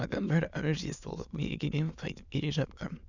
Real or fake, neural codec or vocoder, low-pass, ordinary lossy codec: fake; autoencoder, 22.05 kHz, a latent of 192 numbers a frame, VITS, trained on many speakers; 7.2 kHz; none